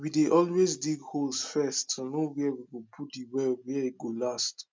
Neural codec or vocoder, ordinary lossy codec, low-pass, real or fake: none; none; none; real